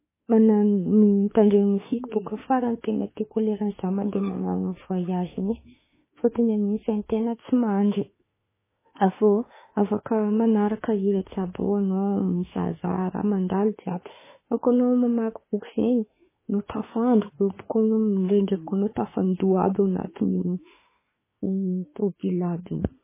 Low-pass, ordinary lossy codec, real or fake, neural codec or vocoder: 3.6 kHz; MP3, 16 kbps; fake; autoencoder, 48 kHz, 32 numbers a frame, DAC-VAE, trained on Japanese speech